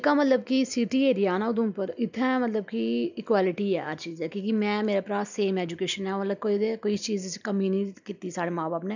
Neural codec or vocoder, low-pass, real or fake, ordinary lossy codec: none; 7.2 kHz; real; none